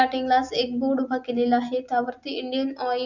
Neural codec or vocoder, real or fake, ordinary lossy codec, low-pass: none; real; none; 7.2 kHz